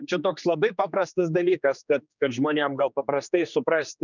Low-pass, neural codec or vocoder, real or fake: 7.2 kHz; codec, 16 kHz, 2 kbps, X-Codec, HuBERT features, trained on general audio; fake